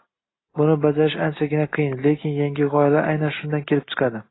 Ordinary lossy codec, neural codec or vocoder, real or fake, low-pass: AAC, 16 kbps; none; real; 7.2 kHz